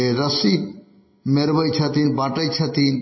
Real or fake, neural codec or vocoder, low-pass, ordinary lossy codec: real; none; 7.2 kHz; MP3, 24 kbps